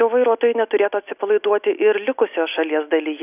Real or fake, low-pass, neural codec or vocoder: real; 3.6 kHz; none